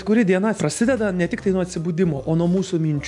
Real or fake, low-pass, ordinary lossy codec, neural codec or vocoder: real; 10.8 kHz; MP3, 64 kbps; none